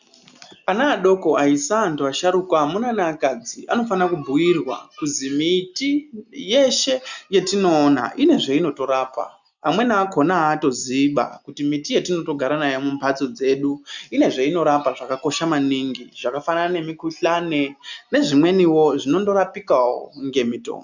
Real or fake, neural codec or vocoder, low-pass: real; none; 7.2 kHz